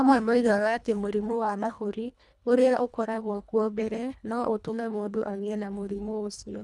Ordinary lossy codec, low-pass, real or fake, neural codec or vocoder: none; none; fake; codec, 24 kHz, 1.5 kbps, HILCodec